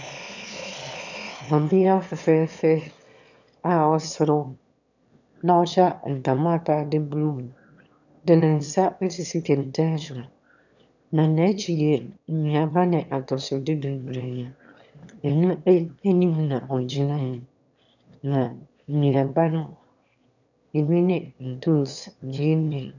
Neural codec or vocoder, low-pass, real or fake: autoencoder, 22.05 kHz, a latent of 192 numbers a frame, VITS, trained on one speaker; 7.2 kHz; fake